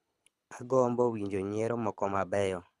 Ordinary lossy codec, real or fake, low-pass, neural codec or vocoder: none; fake; none; codec, 24 kHz, 6 kbps, HILCodec